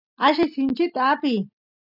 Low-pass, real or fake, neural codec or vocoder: 5.4 kHz; real; none